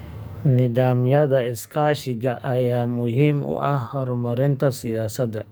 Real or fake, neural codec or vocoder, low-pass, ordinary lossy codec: fake; codec, 44.1 kHz, 2.6 kbps, SNAC; none; none